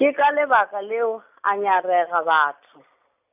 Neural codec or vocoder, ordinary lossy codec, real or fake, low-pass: none; none; real; 3.6 kHz